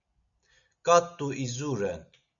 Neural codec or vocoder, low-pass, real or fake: none; 7.2 kHz; real